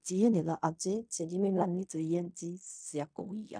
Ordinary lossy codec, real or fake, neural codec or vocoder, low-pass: none; fake; codec, 16 kHz in and 24 kHz out, 0.4 kbps, LongCat-Audio-Codec, fine tuned four codebook decoder; 9.9 kHz